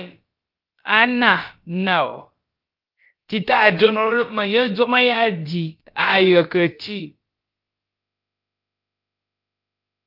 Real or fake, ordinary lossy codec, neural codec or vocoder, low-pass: fake; Opus, 32 kbps; codec, 16 kHz, about 1 kbps, DyCAST, with the encoder's durations; 5.4 kHz